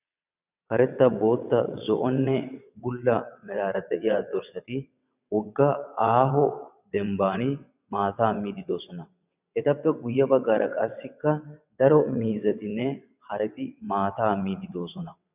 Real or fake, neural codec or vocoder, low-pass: fake; vocoder, 22.05 kHz, 80 mel bands, WaveNeXt; 3.6 kHz